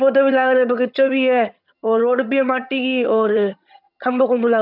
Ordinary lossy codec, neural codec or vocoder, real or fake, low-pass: none; vocoder, 22.05 kHz, 80 mel bands, HiFi-GAN; fake; 5.4 kHz